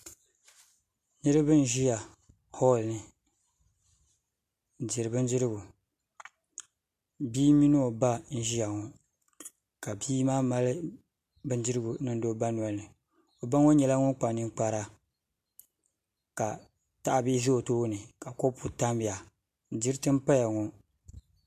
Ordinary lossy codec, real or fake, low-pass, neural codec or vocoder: MP3, 64 kbps; real; 14.4 kHz; none